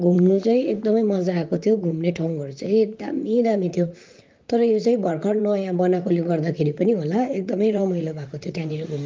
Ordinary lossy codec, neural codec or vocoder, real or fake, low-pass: Opus, 24 kbps; vocoder, 44.1 kHz, 128 mel bands, Pupu-Vocoder; fake; 7.2 kHz